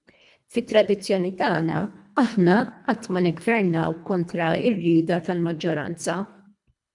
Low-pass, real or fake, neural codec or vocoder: 10.8 kHz; fake; codec, 24 kHz, 1.5 kbps, HILCodec